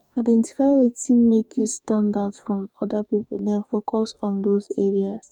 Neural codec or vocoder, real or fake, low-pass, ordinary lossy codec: codec, 44.1 kHz, 2.6 kbps, DAC; fake; 19.8 kHz; none